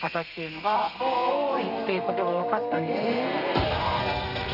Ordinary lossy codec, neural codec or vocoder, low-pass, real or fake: none; codec, 32 kHz, 1.9 kbps, SNAC; 5.4 kHz; fake